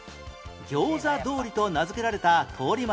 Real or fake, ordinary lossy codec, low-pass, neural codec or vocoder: real; none; none; none